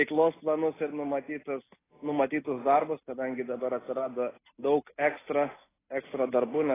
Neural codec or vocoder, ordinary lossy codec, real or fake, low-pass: none; AAC, 16 kbps; real; 3.6 kHz